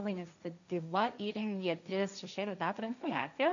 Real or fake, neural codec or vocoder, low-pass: fake; codec, 16 kHz, 1.1 kbps, Voila-Tokenizer; 7.2 kHz